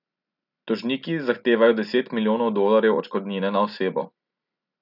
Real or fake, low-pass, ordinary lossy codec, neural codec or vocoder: real; 5.4 kHz; none; none